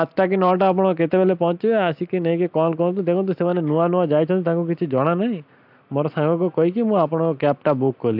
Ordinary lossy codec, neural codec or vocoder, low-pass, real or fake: AAC, 48 kbps; none; 5.4 kHz; real